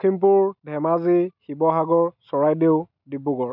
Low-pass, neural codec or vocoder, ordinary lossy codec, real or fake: 5.4 kHz; none; none; real